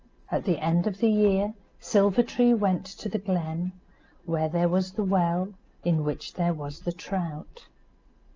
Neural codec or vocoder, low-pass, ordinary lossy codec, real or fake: none; 7.2 kHz; Opus, 24 kbps; real